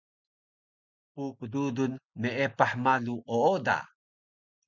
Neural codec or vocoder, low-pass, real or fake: none; 7.2 kHz; real